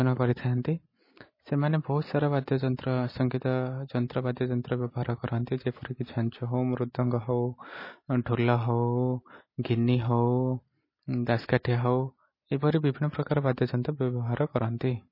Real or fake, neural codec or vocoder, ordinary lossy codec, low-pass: real; none; MP3, 24 kbps; 5.4 kHz